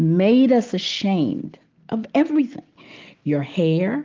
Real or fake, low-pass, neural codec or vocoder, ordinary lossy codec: real; 7.2 kHz; none; Opus, 32 kbps